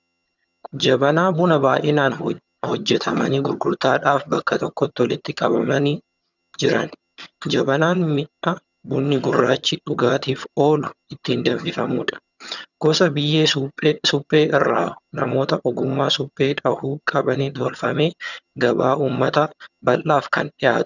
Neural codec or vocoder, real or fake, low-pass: vocoder, 22.05 kHz, 80 mel bands, HiFi-GAN; fake; 7.2 kHz